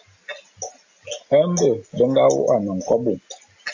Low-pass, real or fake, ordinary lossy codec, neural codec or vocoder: 7.2 kHz; real; AAC, 48 kbps; none